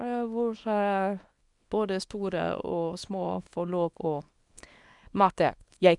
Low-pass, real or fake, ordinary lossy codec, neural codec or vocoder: 10.8 kHz; fake; none; codec, 24 kHz, 0.9 kbps, WavTokenizer, small release